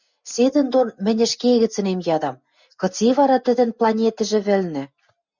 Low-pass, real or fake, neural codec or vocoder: 7.2 kHz; real; none